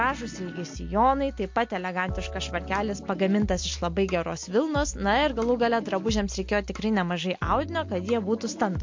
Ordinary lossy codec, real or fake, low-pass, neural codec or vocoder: MP3, 48 kbps; real; 7.2 kHz; none